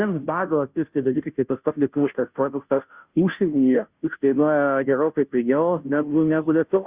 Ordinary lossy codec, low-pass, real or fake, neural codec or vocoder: Opus, 64 kbps; 3.6 kHz; fake; codec, 16 kHz, 0.5 kbps, FunCodec, trained on Chinese and English, 25 frames a second